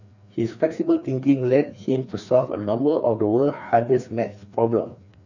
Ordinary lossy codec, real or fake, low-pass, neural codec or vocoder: none; fake; 7.2 kHz; codec, 16 kHz, 2 kbps, FreqCodec, larger model